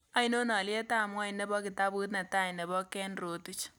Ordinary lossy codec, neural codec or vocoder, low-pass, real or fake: none; none; none; real